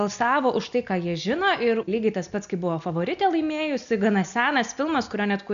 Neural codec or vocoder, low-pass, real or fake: none; 7.2 kHz; real